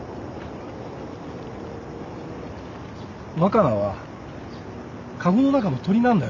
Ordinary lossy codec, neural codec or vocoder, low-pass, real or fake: none; none; 7.2 kHz; real